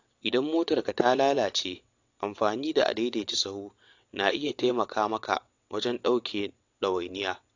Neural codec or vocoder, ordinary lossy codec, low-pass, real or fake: vocoder, 22.05 kHz, 80 mel bands, WaveNeXt; AAC, 48 kbps; 7.2 kHz; fake